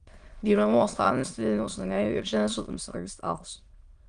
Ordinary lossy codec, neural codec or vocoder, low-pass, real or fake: Opus, 24 kbps; autoencoder, 22.05 kHz, a latent of 192 numbers a frame, VITS, trained on many speakers; 9.9 kHz; fake